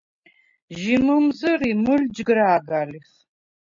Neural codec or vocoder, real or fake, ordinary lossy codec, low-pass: none; real; MP3, 48 kbps; 7.2 kHz